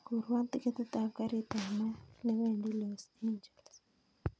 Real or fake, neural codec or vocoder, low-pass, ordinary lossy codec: real; none; none; none